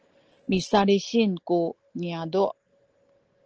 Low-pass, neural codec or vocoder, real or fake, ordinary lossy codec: 7.2 kHz; none; real; Opus, 16 kbps